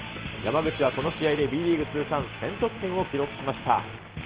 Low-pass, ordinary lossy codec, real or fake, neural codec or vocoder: 3.6 kHz; Opus, 16 kbps; real; none